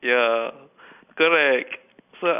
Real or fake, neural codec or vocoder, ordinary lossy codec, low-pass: real; none; none; 3.6 kHz